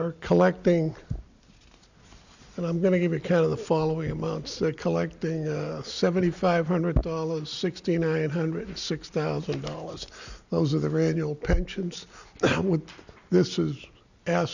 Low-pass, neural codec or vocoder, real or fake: 7.2 kHz; none; real